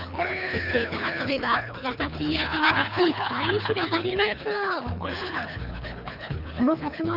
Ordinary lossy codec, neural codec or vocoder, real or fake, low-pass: none; codec, 24 kHz, 3 kbps, HILCodec; fake; 5.4 kHz